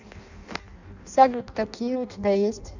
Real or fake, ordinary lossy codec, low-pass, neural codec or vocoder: fake; none; 7.2 kHz; codec, 16 kHz in and 24 kHz out, 0.6 kbps, FireRedTTS-2 codec